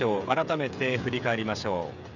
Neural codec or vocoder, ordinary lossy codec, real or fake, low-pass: codec, 16 kHz, 16 kbps, FreqCodec, smaller model; none; fake; 7.2 kHz